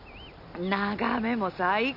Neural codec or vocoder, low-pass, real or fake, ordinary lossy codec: none; 5.4 kHz; real; none